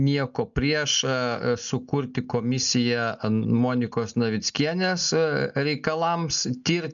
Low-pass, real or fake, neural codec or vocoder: 7.2 kHz; real; none